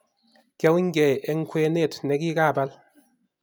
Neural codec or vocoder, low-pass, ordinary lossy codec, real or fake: none; none; none; real